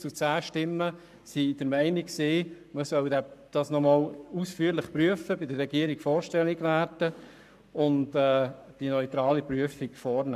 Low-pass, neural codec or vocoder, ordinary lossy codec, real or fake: 14.4 kHz; codec, 44.1 kHz, 7.8 kbps, Pupu-Codec; none; fake